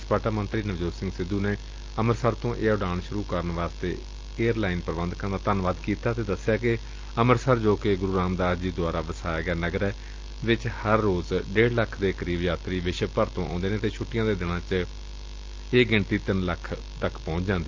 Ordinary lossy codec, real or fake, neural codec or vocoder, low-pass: Opus, 24 kbps; real; none; 7.2 kHz